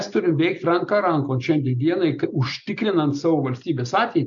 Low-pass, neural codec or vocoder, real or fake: 7.2 kHz; none; real